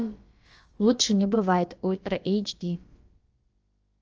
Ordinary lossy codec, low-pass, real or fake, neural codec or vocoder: Opus, 24 kbps; 7.2 kHz; fake; codec, 16 kHz, about 1 kbps, DyCAST, with the encoder's durations